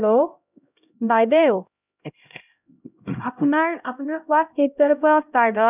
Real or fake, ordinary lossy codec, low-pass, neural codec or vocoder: fake; none; 3.6 kHz; codec, 16 kHz, 0.5 kbps, X-Codec, HuBERT features, trained on LibriSpeech